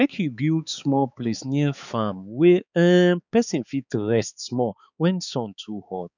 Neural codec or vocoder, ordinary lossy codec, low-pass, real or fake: codec, 16 kHz, 4 kbps, X-Codec, HuBERT features, trained on balanced general audio; none; 7.2 kHz; fake